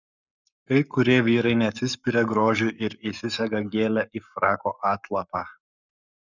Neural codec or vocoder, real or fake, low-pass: codec, 44.1 kHz, 7.8 kbps, Pupu-Codec; fake; 7.2 kHz